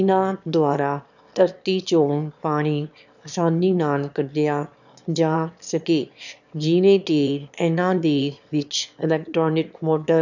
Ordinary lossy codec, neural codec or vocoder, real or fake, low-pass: none; autoencoder, 22.05 kHz, a latent of 192 numbers a frame, VITS, trained on one speaker; fake; 7.2 kHz